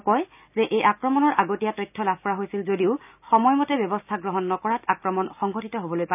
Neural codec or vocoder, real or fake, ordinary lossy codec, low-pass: none; real; none; 3.6 kHz